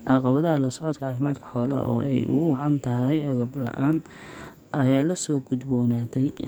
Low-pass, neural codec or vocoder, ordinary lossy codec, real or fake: none; codec, 44.1 kHz, 2.6 kbps, SNAC; none; fake